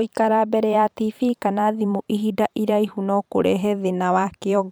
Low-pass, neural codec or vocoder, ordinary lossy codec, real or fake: none; vocoder, 44.1 kHz, 128 mel bands every 512 samples, BigVGAN v2; none; fake